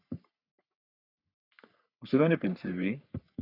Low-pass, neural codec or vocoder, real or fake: 5.4 kHz; codec, 44.1 kHz, 3.4 kbps, Pupu-Codec; fake